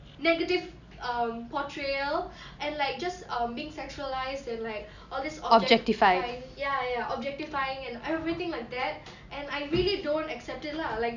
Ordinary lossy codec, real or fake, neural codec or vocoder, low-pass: none; real; none; 7.2 kHz